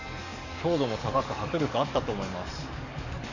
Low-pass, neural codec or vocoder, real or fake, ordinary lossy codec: 7.2 kHz; codec, 44.1 kHz, 7.8 kbps, DAC; fake; none